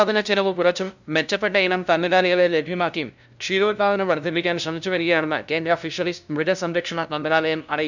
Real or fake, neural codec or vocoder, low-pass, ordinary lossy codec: fake; codec, 16 kHz, 0.5 kbps, FunCodec, trained on LibriTTS, 25 frames a second; 7.2 kHz; none